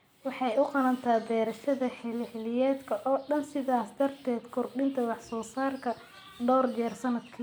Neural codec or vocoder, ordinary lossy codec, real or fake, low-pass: none; none; real; none